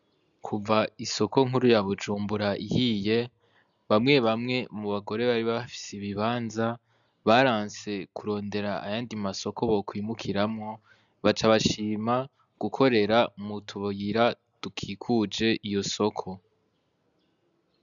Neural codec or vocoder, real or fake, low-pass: none; real; 7.2 kHz